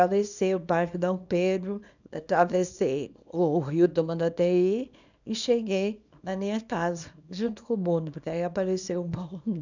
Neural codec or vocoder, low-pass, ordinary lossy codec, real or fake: codec, 24 kHz, 0.9 kbps, WavTokenizer, small release; 7.2 kHz; none; fake